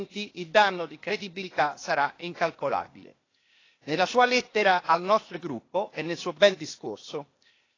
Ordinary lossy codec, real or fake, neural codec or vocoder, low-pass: AAC, 32 kbps; fake; codec, 16 kHz, 0.8 kbps, ZipCodec; 7.2 kHz